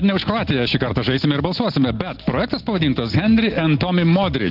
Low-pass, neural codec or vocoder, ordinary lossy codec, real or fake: 5.4 kHz; none; Opus, 16 kbps; real